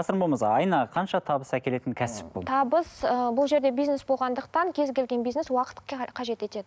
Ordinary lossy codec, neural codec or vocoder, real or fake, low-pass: none; none; real; none